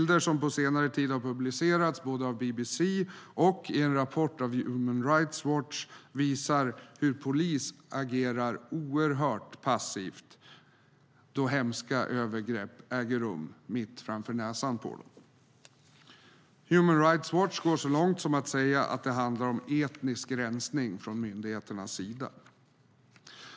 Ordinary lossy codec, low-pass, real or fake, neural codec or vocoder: none; none; real; none